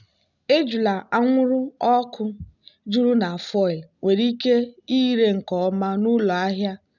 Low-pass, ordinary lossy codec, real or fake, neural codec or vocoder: 7.2 kHz; none; real; none